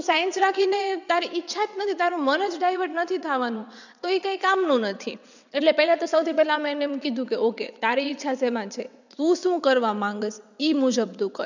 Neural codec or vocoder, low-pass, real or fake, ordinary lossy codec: vocoder, 22.05 kHz, 80 mel bands, WaveNeXt; 7.2 kHz; fake; none